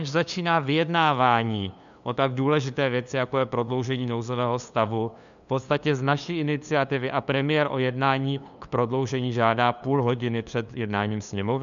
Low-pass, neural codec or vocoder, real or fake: 7.2 kHz; codec, 16 kHz, 2 kbps, FunCodec, trained on LibriTTS, 25 frames a second; fake